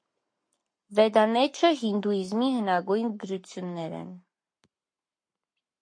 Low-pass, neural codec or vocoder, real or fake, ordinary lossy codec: 9.9 kHz; codec, 44.1 kHz, 7.8 kbps, Pupu-Codec; fake; MP3, 48 kbps